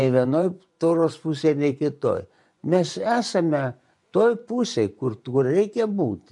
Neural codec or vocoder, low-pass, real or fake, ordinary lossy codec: vocoder, 24 kHz, 100 mel bands, Vocos; 10.8 kHz; fake; MP3, 64 kbps